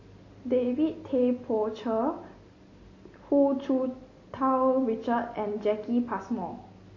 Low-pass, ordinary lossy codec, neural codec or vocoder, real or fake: 7.2 kHz; MP3, 32 kbps; none; real